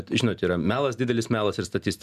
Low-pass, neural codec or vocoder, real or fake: 14.4 kHz; none; real